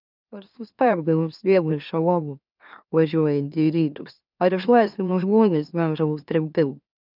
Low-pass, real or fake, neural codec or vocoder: 5.4 kHz; fake; autoencoder, 44.1 kHz, a latent of 192 numbers a frame, MeloTTS